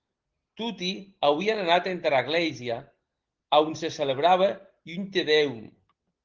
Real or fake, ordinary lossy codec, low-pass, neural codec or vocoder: real; Opus, 16 kbps; 7.2 kHz; none